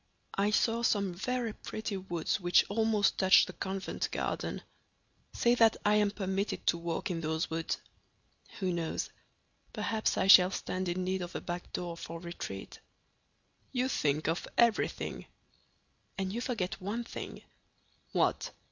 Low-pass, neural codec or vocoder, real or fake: 7.2 kHz; none; real